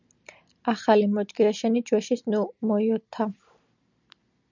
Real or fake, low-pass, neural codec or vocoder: real; 7.2 kHz; none